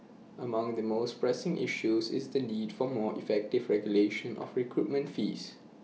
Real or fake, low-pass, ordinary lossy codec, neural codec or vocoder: real; none; none; none